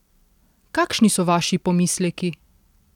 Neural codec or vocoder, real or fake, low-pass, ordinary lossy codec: none; real; 19.8 kHz; none